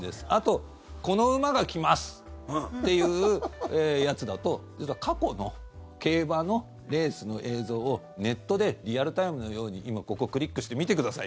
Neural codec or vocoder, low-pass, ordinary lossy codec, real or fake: none; none; none; real